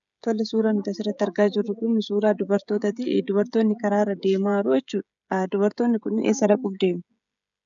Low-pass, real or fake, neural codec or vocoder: 7.2 kHz; fake; codec, 16 kHz, 16 kbps, FreqCodec, smaller model